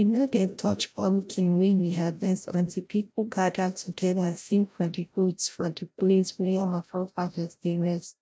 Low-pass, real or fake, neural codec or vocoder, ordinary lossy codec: none; fake; codec, 16 kHz, 0.5 kbps, FreqCodec, larger model; none